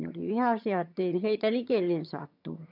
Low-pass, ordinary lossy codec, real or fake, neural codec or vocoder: 5.4 kHz; none; fake; vocoder, 22.05 kHz, 80 mel bands, HiFi-GAN